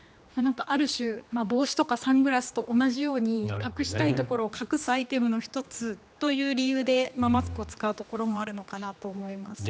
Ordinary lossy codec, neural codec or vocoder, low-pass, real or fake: none; codec, 16 kHz, 2 kbps, X-Codec, HuBERT features, trained on general audio; none; fake